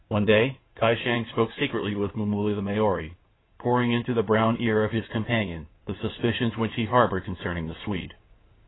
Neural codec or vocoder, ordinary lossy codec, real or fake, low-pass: codec, 16 kHz in and 24 kHz out, 2.2 kbps, FireRedTTS-2 codec; AAC, 16 kbps; fake; 7.2 kHz